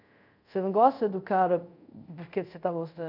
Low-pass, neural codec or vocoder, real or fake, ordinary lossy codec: 5.4 kHz; codec, 24 kHz, 0.5 kbps, DualCodec; fake; none